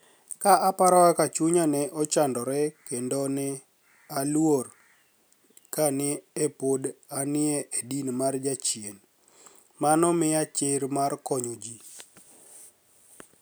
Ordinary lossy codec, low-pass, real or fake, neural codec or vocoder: none; none; real; none